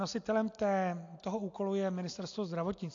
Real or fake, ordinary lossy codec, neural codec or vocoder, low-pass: real; AAC, 48 kbps; none; 7.2 kHz